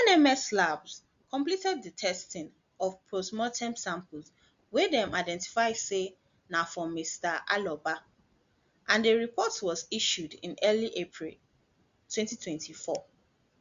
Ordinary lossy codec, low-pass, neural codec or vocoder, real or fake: Opus, 64 kbps; 7.2 kHz; none; real